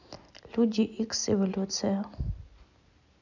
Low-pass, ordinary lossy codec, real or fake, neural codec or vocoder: 7.2 kHz; none; real; none